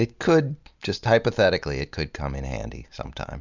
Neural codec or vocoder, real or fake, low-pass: none; real; 7.2 kHz